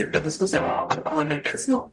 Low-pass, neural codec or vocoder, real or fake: 10.8 kHz; codec, 44.1 kHz, 0.9 kbps, DAC; fake